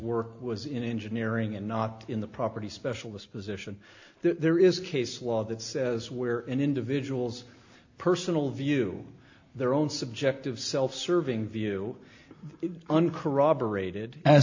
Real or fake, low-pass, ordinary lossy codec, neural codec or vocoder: real; 7.2 kHz; AAC, 48 kbps; none